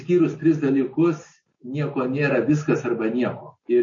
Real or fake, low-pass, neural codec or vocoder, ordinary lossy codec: real; 7.2 kHz; none; MP3, 32 kbps